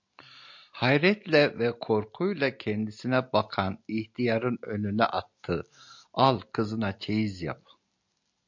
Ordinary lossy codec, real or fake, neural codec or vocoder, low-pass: MP3, 48 kbps; real; none; 7.2 kHz